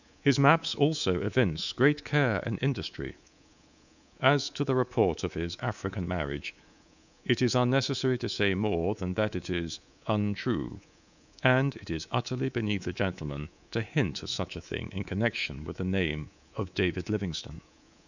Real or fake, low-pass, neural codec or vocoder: fake; 7.2 kHz; codec, 24 kHz, 3.1 kbps, DualCodec